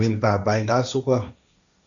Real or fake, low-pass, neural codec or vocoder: fake; 7.2 kHz; codec, 16 kHz, 1.1 kbps, Voila-Tokenizer